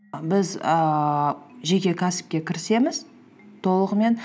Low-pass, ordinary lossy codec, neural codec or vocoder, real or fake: none; none; none; real